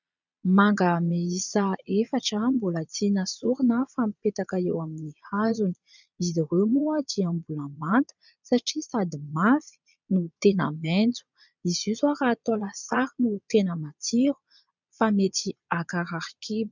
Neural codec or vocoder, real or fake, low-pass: vocoder, 22.05 kHz, 80 mel bands, Vocos; fake; 7.2 kHz